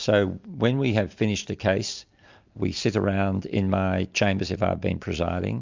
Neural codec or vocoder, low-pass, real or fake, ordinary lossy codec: none; 7.2 kHz; real; MP3, 64 kbps